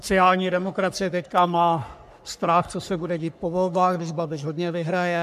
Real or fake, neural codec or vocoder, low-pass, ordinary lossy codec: fake; codec, 44.1 kHz, 3.4 kbps, Pupu-Codec; 14.4 kHz; MP3, 96 kbps